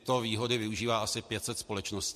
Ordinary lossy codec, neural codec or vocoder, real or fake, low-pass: MP3, 64 kbps; none; real; 14.4 kHz